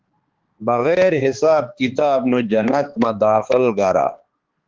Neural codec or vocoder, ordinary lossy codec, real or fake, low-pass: codec, 16 kHz, 2 kbps, X-Codec, HuBERT features, trained on balanced general audio; Opus, 16 kbps; fake; 7.2 kHz